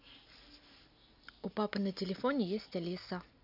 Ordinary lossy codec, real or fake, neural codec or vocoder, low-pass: none; real; none; 5.4 kHz